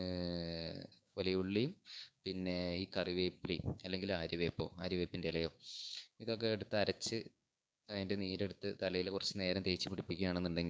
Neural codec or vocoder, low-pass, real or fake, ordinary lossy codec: codec, 16 kHz, 6 kbps, DAC; none; fake; none